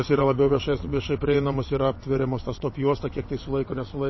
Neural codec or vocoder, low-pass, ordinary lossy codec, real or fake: vocoder, 22.05 kHz, 80 mel bands, WaveNeXt; 7.2 kHz; MP3, 24 kbps; fake